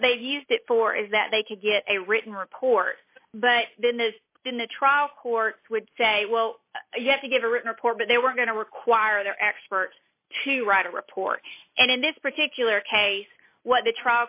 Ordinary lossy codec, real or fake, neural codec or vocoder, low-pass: AAC, 32 kbps; real; none; 3.6 kHz